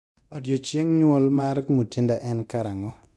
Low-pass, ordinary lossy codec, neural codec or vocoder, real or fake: none; none; codec, 24 kHz, 0.9 kbps, DualCodec; fake